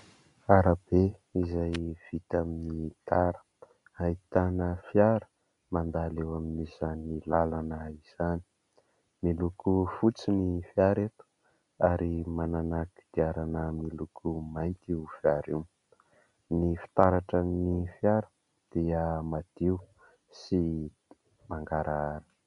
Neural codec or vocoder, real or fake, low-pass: none; real; 10.8 kHz